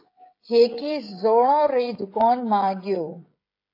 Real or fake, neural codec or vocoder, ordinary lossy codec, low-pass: fake; codec, 16 kHz, 16 kbps, FreqCodec, smaller model; AAC, 32 kbps; 5.4 kHz